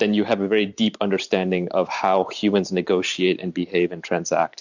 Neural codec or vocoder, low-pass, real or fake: vocoder, 44.1 kHz, 128 mel bands every 512 samples, BigVGAN v2; 7.2 kHz; fake